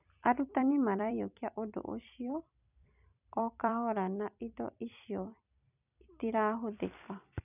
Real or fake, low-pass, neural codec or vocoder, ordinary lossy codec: fake; 3.6 kHz; vocoder, 44.1 kHz, 128 mel bands every 256 samples, BigVGAN v2; none